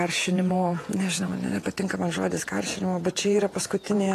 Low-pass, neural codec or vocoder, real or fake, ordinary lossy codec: 14.4 kHz; vocoder, 48 kHz, 128 mel bands, Vocos; fake; AAC, 48 kbps